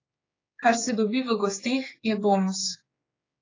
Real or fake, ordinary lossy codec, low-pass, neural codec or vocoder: fake; AAC, 32 kbps; 7.2 kHz; codec, 16 kHz, 4 kbps, X-Codec, HuBERT features, trained on general audio